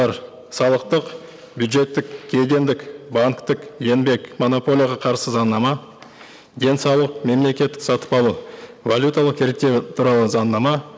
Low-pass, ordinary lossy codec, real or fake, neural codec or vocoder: none; none; real; none